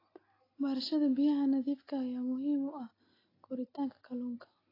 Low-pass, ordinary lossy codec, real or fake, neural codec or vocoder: 5.4 kHz; AAC, 24 kbps; real; none